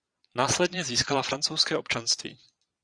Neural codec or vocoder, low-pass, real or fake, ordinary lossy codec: vocoder, 22.05 kHz, 80 mel bands, Vocos; 9.9 kHz; fake; Opus, 64 kbps